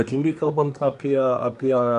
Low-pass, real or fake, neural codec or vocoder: 10.8 kHz; fake; codec, 24 kHz, 1 kbps, SNAC